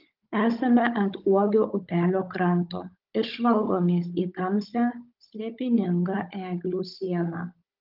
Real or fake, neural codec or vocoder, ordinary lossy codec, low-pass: fake; codec, 16 kHz, 16 kbps, FunCodec, trained on Chinese and English, 50 frames a second; Opus, 32 kbps; 5.4 kHz